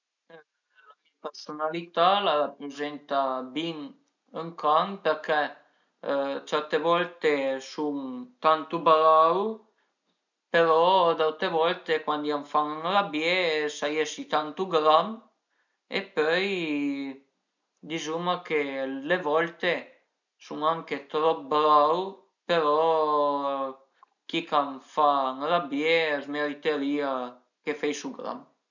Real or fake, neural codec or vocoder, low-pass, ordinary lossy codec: real; none; 7.2 kHz; none